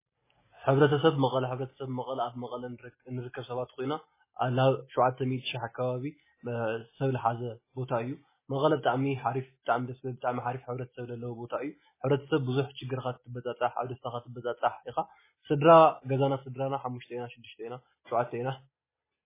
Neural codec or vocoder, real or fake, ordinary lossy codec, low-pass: none; real; MP3, 16 kbps; 3.6 kHz